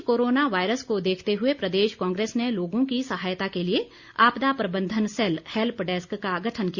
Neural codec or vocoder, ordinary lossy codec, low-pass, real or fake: none; Opus, 64 kbps; 7.2 kHz; real